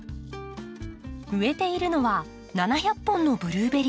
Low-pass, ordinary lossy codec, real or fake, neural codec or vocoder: none; none; real; none